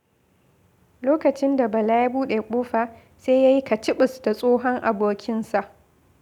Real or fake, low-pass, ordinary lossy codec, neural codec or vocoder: real; 19.8 kHz; none; none